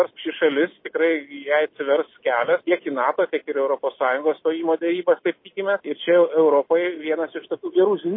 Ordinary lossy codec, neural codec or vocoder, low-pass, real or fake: MP3, 24 kbps; none; 5.4 kHz; real